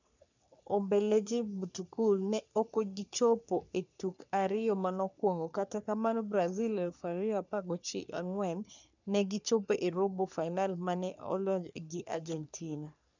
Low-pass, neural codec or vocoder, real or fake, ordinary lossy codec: 7.2 kHz; codec, 44.1 kHz, 3.4 kbps, Pupu-Codec; fake; none